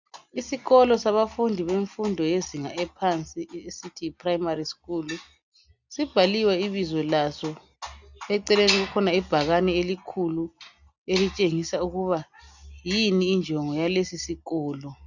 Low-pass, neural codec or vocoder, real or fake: 7.2 kHz; none; real